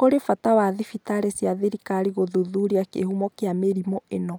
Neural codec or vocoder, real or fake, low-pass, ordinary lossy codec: none; real; none; none